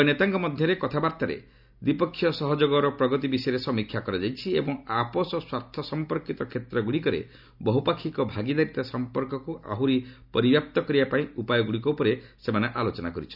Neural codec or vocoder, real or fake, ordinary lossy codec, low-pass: none; real; none; 5.4 kHz